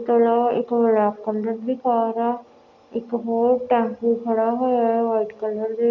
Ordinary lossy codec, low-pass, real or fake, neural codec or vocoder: none; 7.2 kHz; real; none